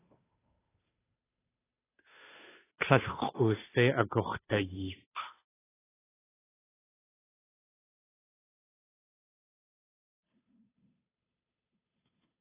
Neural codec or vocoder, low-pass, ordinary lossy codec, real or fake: codec, 16 kHz, 2 kbps, FunCodec, trained on Chinese and English, 25 frames a second; 3.6 kHz; AAC, 16 kbps; fake